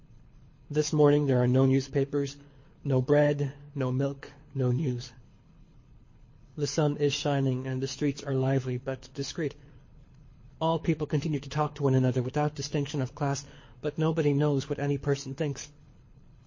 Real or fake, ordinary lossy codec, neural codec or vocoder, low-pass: fake; MP3, 32 kbps; codec, 24 kHz, 6 kbps, HILCodec; 7.2 kHz